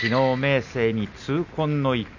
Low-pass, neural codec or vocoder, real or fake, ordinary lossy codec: 7.2 kHz; none; real; none